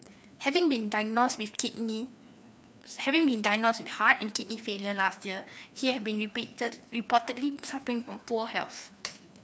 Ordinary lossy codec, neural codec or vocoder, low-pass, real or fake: none; codec, 16 kHz, 2 kbps, FreqCodec, larger model; none; fake